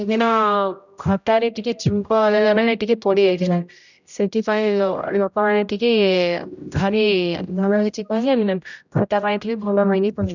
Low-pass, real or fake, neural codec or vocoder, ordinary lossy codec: 7.2 kHz; fake; codec, 16 kHz, 0.5 kbps, X-Codec, HuBERT features, trained on general audio; none